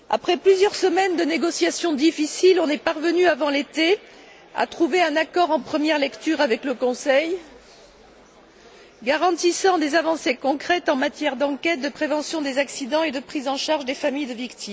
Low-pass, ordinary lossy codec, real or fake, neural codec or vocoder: none; none; real; none